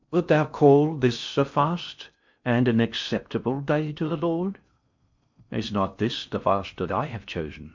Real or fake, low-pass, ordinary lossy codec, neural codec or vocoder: fake; 7.2 kHz; MP3, 64 kbps; codec, 16 kHz in and 24 kHz out, 0.6 kbps, FocalCodec, streaming, 4096 codes